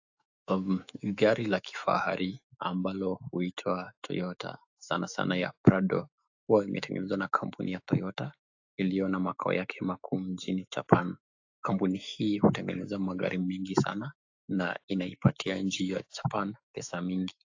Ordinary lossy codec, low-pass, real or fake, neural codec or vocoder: AAC, 48 kbps; 7.2 kHz; fake; autoencoder, 48 kHz, 128 numbers a frame, DAC-VAE, trained on Japanese speech